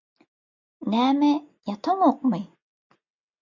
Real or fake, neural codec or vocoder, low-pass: real; none; 7.2 kHz